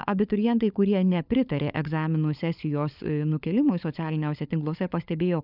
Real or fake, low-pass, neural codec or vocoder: fake; 5.4 kHz; codec, 16 kHz, 16 kbps, FunCodec, trained on LibriTTS, 50 frames a second